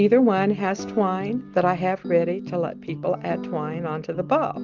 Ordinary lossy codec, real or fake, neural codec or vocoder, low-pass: Opus, 32 kbps; real; none; 7.2 kHz